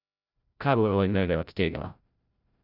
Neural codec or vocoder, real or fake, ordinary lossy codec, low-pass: codec, 16 kHz, 0.5 kbps, FreqCodec, larger model; fake; none; 5.4 kHz